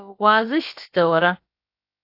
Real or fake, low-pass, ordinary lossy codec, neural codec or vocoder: fake; 5.4 kHz; AAC, 32 kbps; codec, 16 kHz, about 1 kbps, DyCAST, with the encoder's durations